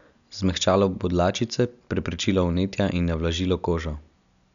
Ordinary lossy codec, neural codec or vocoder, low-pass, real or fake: none; none; 7.2 kHz; real